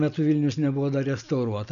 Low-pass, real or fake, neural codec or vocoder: 7.2 kHz; real; none